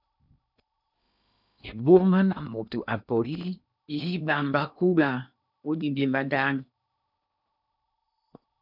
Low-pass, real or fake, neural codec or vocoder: 5.4 kHz; fake; codec, 16 kHz in and 24 kHz out, 0.8 kbps, FocalCodec, streaming, 65536 codes